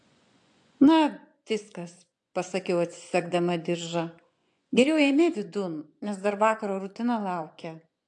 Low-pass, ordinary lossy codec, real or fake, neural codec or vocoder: 10.8 kHz; AAC, 64 kbps; fake; vocoder, 24 kHz, 100 mel bands, Vocos